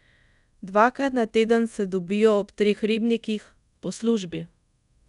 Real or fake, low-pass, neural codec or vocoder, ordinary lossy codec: fake; 10.8 kHz; codec, 24 kHz, 0.5 kbps, DualCodec; none